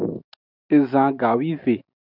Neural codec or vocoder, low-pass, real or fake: none; 5.4 kHz; real